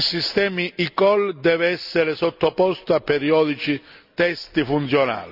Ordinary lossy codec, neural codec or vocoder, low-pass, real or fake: none; none; 5.4 kHz; real